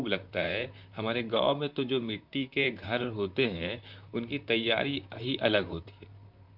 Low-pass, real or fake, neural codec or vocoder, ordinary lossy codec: 5.4 kHz; fake; vocoder, 44.1 kHz, 128 mel bands, Pupu-Vocoder; none